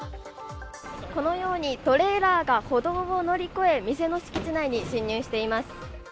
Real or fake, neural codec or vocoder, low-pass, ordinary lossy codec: real; none; none; none